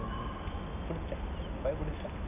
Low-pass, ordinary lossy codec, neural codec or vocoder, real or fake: 3.6 kHz; none; none; real